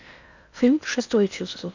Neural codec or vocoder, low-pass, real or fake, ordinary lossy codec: codec, 16 kHz in and 24 kHz out, 0.8 kbps, FocalCodec, streaming, 65536 codes; 7.2 kHz; fake; none